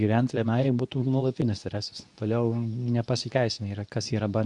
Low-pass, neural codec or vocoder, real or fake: 10.8 kHz; codec, 24 kHz, 0.9 kbps, WavTokenizer, medium speech release version 2; fake